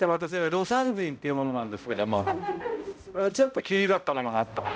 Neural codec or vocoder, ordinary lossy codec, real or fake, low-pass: codec, 16 kHz, 0.5 kbps, X-Codec, HuBERT features, trained on balanced general audio; none; fake; none